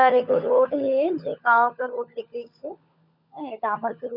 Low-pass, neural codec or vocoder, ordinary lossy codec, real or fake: 5.4 kHz; codec, 16 kHz, 16 kbps, FunCodec, trained on LibriTTS, 50 frames a second; none; fake